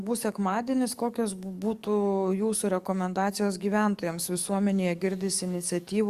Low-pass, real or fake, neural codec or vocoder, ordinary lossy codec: 14.4 kHz; fake; codec, 44.1 kHz, 7.8 kbps, DAC; Opus, 64 kbps